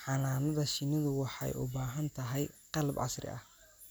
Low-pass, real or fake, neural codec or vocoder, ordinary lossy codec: none; real; none; none